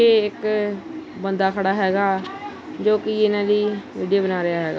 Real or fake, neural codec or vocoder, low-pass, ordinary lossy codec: real; none; none; none